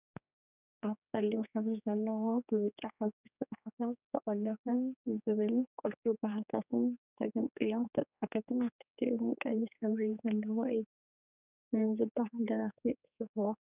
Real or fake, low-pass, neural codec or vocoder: fake; 3.6 kHz; codec, 16 kHz, 4 kbps, X-Codec, HuBERT features, trained on general audio